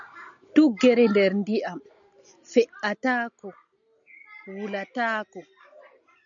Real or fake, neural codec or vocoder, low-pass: real; none; 7.2 kHz